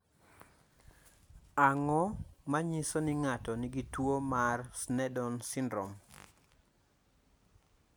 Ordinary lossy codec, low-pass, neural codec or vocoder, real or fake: none; none; none; real